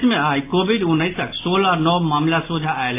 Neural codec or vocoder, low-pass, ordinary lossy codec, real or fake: none; 3.6 kHz; AAC, 24 kbps; real